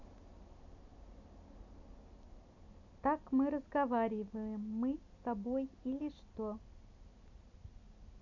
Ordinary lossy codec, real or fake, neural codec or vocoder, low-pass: none; real; none; 7.2 kHz